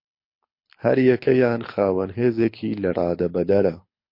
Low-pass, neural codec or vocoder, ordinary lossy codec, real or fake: 5.4 kHz; codec, 24 kHz, 6 kbps, HILCodec; MP3, 32 kbps; fake